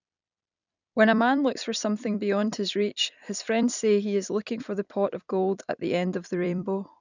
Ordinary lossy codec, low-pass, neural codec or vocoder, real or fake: none; 7.2 kHz; vocoder, 44.1 kHz, 128 mel bands every 256 samples, BigVGAN v2; fake